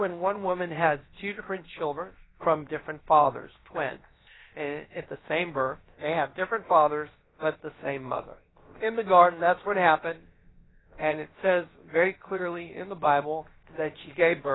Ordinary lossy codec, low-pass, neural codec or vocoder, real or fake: AAC, 16 kbps; 7.2 kHz; codec, 16 kHz, about 1 kbps, DyCAST, with the encoder's durations; fake